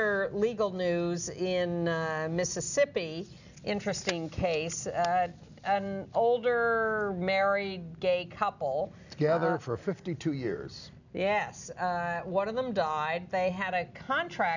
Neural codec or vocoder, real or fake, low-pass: none; real; 7.2 kHz